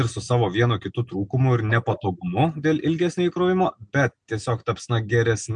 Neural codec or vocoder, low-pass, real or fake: none; 9.9 kHz; real